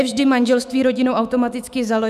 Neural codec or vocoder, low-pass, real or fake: autoencoder, 48 kHz, 128 numbers a frame, DAC-VAE, trained on Japanese speech; 14.4 kHz; fake